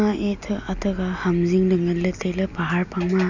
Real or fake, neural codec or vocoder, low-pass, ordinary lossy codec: real; none; 7.2 kHz; none